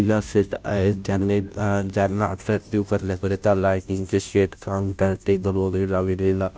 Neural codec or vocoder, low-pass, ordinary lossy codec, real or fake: codec, 16 kHz, 0.5 kbps, FunCodec, trained on Chinese and English, 25 frames a second; none; none; fake